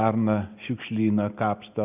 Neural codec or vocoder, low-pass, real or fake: none; 3.6 kHz; real